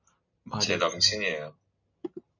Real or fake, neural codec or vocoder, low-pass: real; none; 7.2 kHz